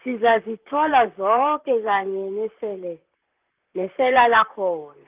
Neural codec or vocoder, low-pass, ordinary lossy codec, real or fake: vocoder, 44.1 kHz, 128 mel bands, Pupu-Vocoder; 3.6 kHz; Opus, 24 kbps; fake